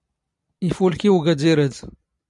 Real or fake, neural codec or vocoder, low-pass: real; none; 10.8 kHz